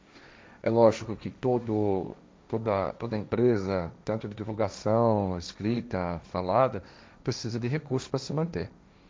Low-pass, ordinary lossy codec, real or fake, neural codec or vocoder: none; none; fake; codec, 16 kHz, 1.1 kbps, Voila-Tokenizer